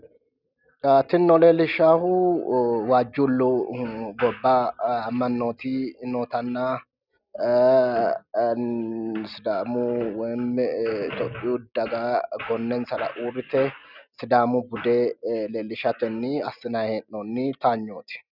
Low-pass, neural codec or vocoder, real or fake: 5.4 kHz; none; real